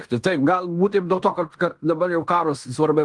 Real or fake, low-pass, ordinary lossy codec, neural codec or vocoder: fake; 10.8 kHz; Opus, 24 kbps; codec, 16 kHz in and 24 kHz out, 0.9 kbps, LongCat-Audio-Codec, fine tuned four codebook decoder